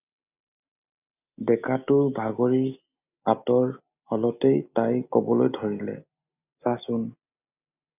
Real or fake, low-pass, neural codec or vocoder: real; 3.6 kHz; none